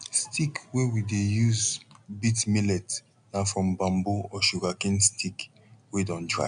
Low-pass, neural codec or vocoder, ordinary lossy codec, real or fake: 9.9 kHz; none; none; real